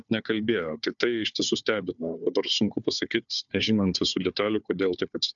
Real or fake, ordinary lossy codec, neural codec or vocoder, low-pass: fake; MP3, 96 kbps; codec, 16 kHz, 4 kbps, FunCodec, trained on Chinese and English, 50 frames a second; 7.2 kHz